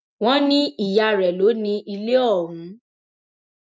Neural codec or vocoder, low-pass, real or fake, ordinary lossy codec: none; none; real; none